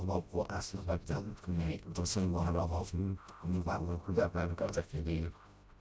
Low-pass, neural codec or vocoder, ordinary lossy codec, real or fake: none; codec, 16 kHz, 0.5 kbps, FreqCodec, smaller model; none; fake